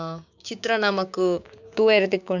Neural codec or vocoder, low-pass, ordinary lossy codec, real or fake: codec, 16 kHz, 6 kbps, DAC; 7.2 kHz; none; fake